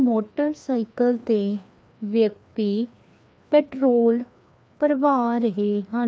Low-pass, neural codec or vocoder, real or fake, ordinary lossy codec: none; codec, 16 kHz, 2 kbps, FreqCodec, larger model; fake; none